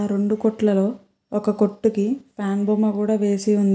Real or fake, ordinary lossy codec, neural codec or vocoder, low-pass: real; none; none; none